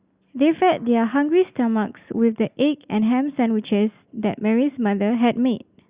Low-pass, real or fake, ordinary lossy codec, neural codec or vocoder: 3.6 kHz; real; Opus, 64 kbps; none